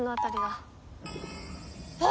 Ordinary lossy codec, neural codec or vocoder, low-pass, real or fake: none; none; none; real